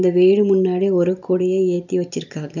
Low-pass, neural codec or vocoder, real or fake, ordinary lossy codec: 7.2 kHz; none; real; none